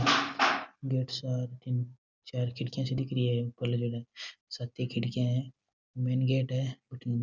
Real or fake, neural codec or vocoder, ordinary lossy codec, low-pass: real; none; none; 7.2 kHz